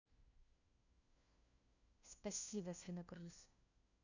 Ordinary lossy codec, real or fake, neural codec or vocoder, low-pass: none; fake; codec, 16 kHz, 1 kbps, FunCodec, trained on LibriTTS, 50 frames a second; 7.2 kHz